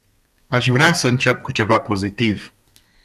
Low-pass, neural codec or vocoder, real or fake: 14.4 kHz; codec, 32 kHz, 1.9 kbps, SNAC; fake